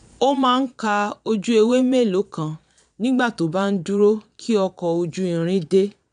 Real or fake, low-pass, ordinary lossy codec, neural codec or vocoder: fake; 9.9 kHz; none; vocoder, 22.05 kHz, 80 mel bands, Vocos